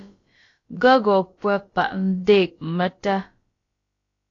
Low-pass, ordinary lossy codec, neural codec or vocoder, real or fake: 7.2 kHz; AAC, 32 kbps; codec, 16 kHz, about 1 kbps, DyCAST, with the encoder's durations; fake